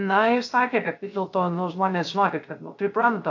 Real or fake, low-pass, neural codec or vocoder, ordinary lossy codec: fake; 7.2 kHz; codec, 16 kHz, 0.3 kbps, FocalCodec; AAC, 48 kbps